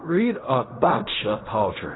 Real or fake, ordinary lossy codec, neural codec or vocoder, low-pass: fake; AAC, 16 kbps; codec, 16 kHz in and 24 kHz out, 0.4 kbps, LongCat-Audio-Codec, fine tuned four codebook decoder; 7.2 kHz